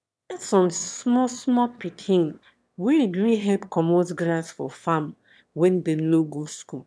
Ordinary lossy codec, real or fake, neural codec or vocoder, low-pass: none; fake; autoencoder, 22.05 kHz, a latent of 192 numbers a frame, VITS, trained on one speaker; none